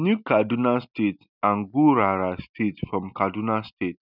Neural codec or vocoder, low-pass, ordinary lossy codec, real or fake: none; 5.4 kHz; none; real